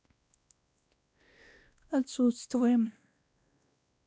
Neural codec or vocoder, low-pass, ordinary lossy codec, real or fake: codec, 16 kHz, 1 kbps, X-Codec, WavLM features, trained on Multilingual LibriSpeech; none; none; fake